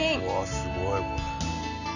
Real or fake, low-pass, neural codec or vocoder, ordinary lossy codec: real; 7.2 kHz; none; none